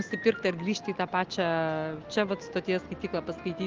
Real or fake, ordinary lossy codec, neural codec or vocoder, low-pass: real; Opus, 32 kbps; none; 7.2 kHz